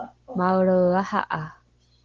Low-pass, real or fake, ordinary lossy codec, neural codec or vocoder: 7.2 kHz; real; Opus, 16 kbps; none